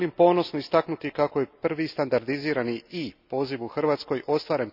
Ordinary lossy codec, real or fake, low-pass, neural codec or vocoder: none; real; 5.4 kHz; none